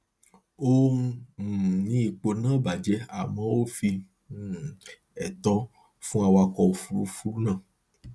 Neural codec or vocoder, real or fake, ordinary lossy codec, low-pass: none; real; none; none